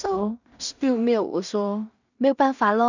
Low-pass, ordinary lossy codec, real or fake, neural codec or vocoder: 7.2 kHz; none; fake; codec, 16 kHz in and 24 kHz out, 0.4 kbps, LongCat-Audio-Codec, two codebook decoder